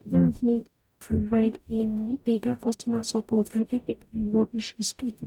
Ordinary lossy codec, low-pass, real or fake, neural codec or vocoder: none; 19.8 kHz; fake; codec, 44.1 kHz, 0.9 kbps, DAC